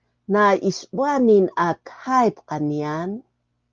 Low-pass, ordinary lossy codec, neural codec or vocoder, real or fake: 7.2 kHz; Opus, 32 kbps; none; real